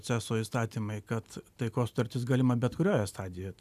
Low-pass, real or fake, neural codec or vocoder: 14.4 kHz; real; none